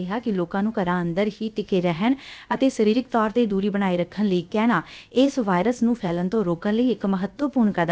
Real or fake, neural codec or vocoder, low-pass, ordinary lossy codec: fake; codec, 16 kHz, about 1 kbps, DyCAST, with the encoder's durations; none; none